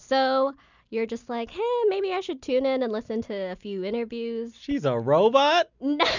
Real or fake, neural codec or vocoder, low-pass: real; none; 7.2 kHz